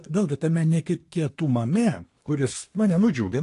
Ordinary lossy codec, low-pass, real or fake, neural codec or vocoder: AAC, 48 kbps; 10.8 kHz; fake; codec, 24 kHz, 1 kbps, SNAC